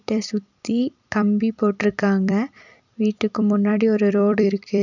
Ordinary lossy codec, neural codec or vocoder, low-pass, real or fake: none; vocoder, 44.1 kHz, 128 mel bands every 256 samples, BigVGAN v2; 7.2 kHz; fake